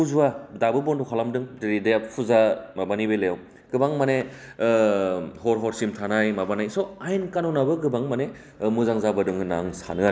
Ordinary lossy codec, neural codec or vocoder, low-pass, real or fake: none; none; none; real